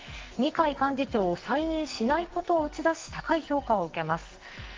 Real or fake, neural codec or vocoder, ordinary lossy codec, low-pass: fake; codec, 44.1 kHz, 2.6 kbps, SNAC; Opus, 32 kbps; 7.2 kHz